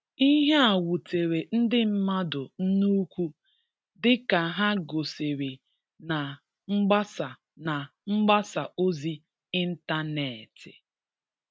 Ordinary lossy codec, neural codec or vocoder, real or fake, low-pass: none; none; real; none